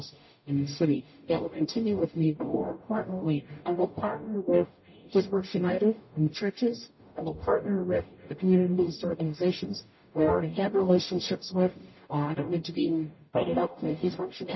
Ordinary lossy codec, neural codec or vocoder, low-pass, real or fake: MP3, 24 kbps; codec, 44.1 kHz, 0.9 kbps, DAC; 7.2 kHz; fake